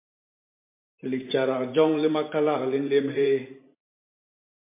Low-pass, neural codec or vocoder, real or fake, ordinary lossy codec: 3.6 kHz; vocoder, 44.1 kHz, 128 mel bands every 512 samples, BigVGAN v2; fake; MP3, 24 kbps